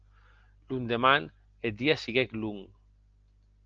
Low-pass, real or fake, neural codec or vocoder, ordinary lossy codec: 7.2 kHz; real; none; Opus, 24 kbps